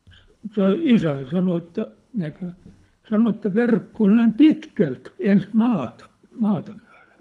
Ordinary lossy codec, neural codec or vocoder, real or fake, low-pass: none; codec, 24 kHz, 3 kbps, HILCodec; fake; none